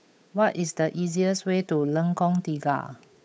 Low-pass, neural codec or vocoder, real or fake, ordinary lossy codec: none; codec, 16 kHz, 8 kbps, FunCodec, trained on Chinese and English, 25 frames a second; fake; none